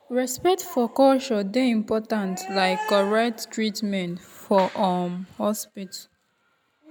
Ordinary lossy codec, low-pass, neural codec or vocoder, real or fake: none; none; none; real